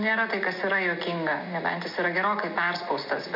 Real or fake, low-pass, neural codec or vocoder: real; 5.4 kHz; none